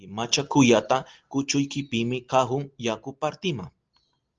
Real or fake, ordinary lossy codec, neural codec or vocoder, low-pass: real; Opus, 24 kbps; none; 7.2 kHz